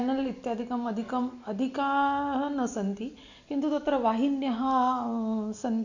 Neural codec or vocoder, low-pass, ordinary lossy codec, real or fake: none; 7.2 kHz; none; real